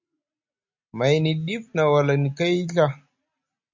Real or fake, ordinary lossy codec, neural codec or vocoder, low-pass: real; MP3, 64 kbps; none; 7.2 kHz